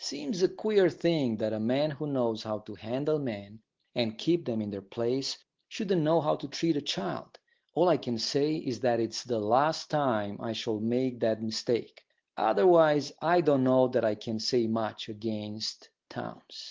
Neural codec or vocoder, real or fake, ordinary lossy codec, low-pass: none; real; Opus, 16 kbps; 7.2 kHz